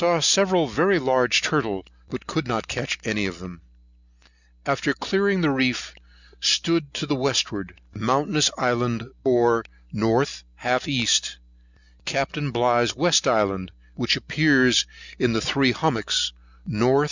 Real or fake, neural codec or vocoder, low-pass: real; none; 7.2 kHz